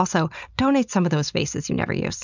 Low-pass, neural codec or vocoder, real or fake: 7.2 kHz; none; real